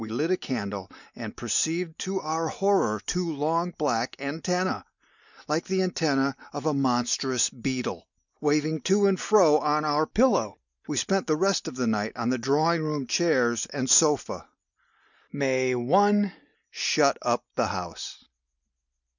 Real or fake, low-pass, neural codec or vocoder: real; 7.2 kHz; none